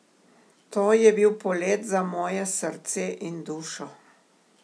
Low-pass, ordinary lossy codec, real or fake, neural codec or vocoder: none; none; real; none